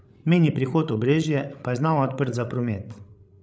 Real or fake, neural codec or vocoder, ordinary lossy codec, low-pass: fake; codec, 16 kHz, 16 kbps, FreqCodec, larger model; none; none